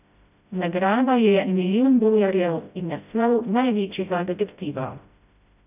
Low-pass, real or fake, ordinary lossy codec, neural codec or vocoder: 3.6 kHz; fake; none; codec, 16 kHz, 0.5 kbps, FreqCodec, smaller model